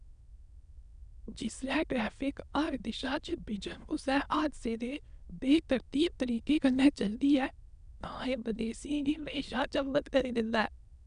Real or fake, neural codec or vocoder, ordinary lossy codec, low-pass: fake; autoencoder, 22.05 kHz, a latent of 192 numbers a frame, VITS, trained on many speakers; none; 9.9 kHz